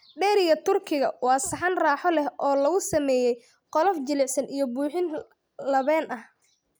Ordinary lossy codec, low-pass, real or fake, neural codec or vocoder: none; none; real; none